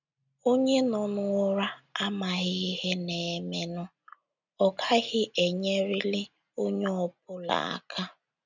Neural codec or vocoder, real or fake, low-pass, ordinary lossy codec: none; real; 7.2 kHz; none